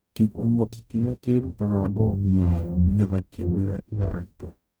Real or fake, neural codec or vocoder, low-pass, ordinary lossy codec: fake; codec, 44.1 kHz, 0.9 kbps, DAC; none; none